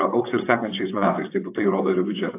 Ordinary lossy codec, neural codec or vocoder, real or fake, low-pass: AAC, 24 kbps; vocoder, 22.05 kHz, 80 mel bands, WaveNeXt; fake; 3.6 kHz